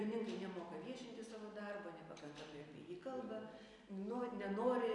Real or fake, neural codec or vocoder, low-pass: real; none; 10.8 kHz